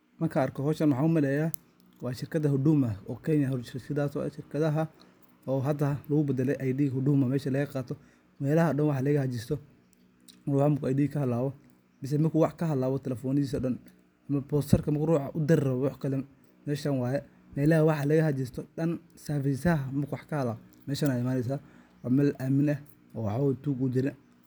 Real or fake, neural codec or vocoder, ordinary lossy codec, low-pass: real; none; none; none